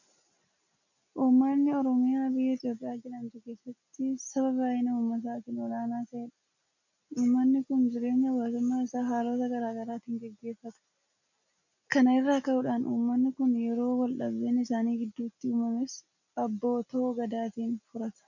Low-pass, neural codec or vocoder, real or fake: 7.2 kHz; none; real